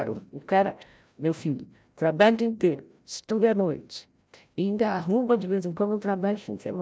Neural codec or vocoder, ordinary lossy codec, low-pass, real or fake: codec, 16 kHz, 0.5 kbps, FreqCodec, larger model; none; none; fake